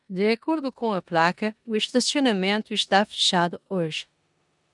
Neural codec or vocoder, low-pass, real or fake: codec, 16 kHz in and 24 kHz out, 0.9 kbps, LongCat-Audio-Codec, four codebook decoder; 10.8 kHz; fake